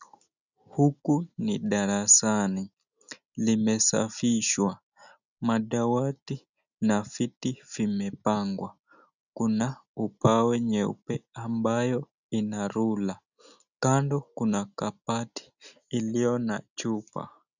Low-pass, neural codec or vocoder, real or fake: 7.2 kHz; none; real